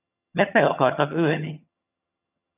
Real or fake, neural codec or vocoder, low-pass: fake; vocoder, 22.05 kHz, 80 mel bands, HiFi-GAN; 3.6 kHz